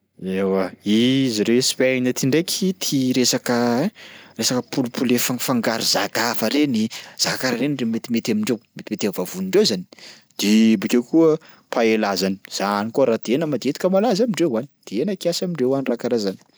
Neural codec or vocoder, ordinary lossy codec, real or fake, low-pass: none; none; real; none